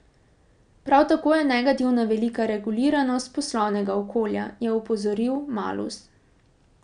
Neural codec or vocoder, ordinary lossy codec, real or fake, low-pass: none; none; real; 9.9 kHz